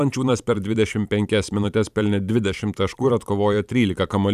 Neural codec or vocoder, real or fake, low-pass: vocoder, 44.1 kHz, 128 mel bands every 512 samples, BigVGAN v2; fake; 14.4 kHz